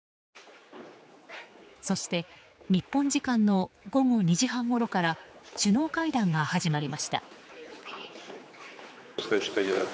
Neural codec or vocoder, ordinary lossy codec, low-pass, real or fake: codec, 16 kHz, 4 kbps, X-Codec, HuBERT features, trained on general audio; none; none; fake